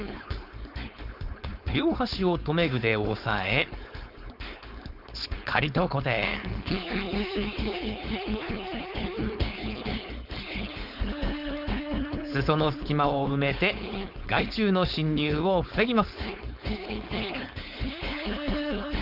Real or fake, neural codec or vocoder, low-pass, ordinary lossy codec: fake; codec, 16 kHz, 4.8 kbps, FACodec; 5.4 kHz; none